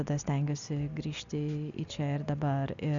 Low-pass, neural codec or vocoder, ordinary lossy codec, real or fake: 7.2 kHz; none; Opus, 64 kbps; real